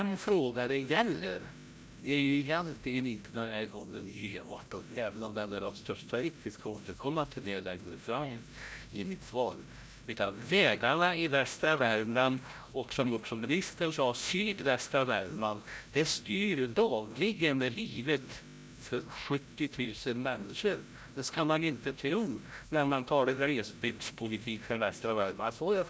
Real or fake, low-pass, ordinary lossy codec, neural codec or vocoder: fake; none; none; codec, 16 kHz, 0.5 kbps, FreqCodec, larger model